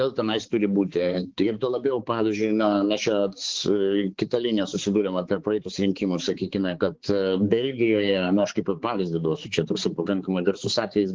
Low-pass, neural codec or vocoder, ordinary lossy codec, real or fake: 7.2 kHz; codec, 16 kHz, 4 kbps, X-Codec, HuBERT features, trained on balanced general audio; Opus, 16 kbps; fake